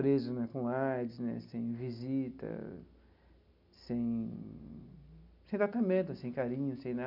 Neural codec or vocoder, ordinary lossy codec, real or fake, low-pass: none; none; real; 5.4 kHz